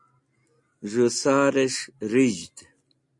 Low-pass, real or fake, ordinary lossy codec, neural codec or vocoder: 10.8 kHz; real; MP3, 48 kbps; none